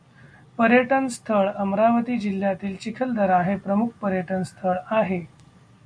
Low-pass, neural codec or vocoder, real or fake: 9.9 kHz; none; real